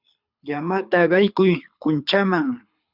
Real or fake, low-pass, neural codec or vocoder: fake; 5.4 kHz; codec, 24 kHz, 6 kbps, HILCodec